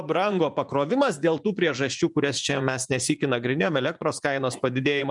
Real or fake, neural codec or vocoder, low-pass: fake; vocoder, 24 kHz, 100 mel bands, Vocos; 10.8 kHz